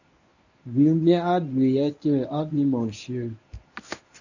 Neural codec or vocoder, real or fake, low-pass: codec, 24 kHz, 0.9 kbps, WavTokenizer, medium speech release version 1; fake; 7.2 kHz